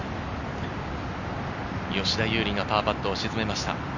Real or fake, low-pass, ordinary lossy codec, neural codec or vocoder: real; 7.2 kHz; none; none